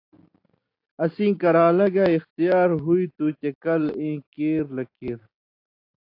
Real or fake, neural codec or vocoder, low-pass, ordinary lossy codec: real; none; 5.4 kHz; AAC, 32 kbps